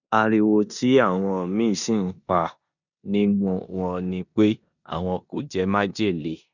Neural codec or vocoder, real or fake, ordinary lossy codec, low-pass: codec, 16 kHz in and 24 kHz out, 0.9 kbps, LongCat-Audio-Codec, four codebook decoder; fake; none; 7.2 kHz